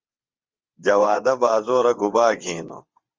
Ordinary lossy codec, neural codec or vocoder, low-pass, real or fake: Opus, 32 kbps; vocoder, 22.05 kHz, 80 mel bands, WaveNeXt; 7.2 kHz; fake